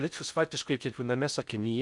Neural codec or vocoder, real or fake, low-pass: codec, 16 kHz in and 24 kHz out, 0.6 kbps, FocalCodec, streaming, 4096 codes; fake; 10.8 kHz